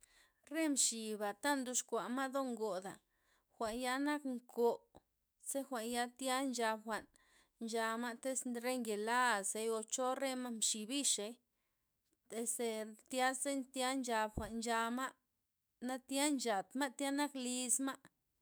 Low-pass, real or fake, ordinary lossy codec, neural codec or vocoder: none; fake; none; autoencoder, 48 kHz, 128 numbers a frame, DAC-VAE, trained on Japanese speech